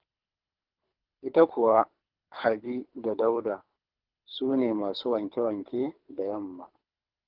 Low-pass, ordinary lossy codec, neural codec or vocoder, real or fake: 5.4 kHz; Opus, 32 kbps; codec, 24 kHz, 3 kbps, HILCodec; fake